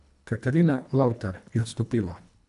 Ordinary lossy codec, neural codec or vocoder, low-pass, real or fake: none; codec, 24 kHz, 1.5 kbps, HILCodec; 10.8 kHz; fake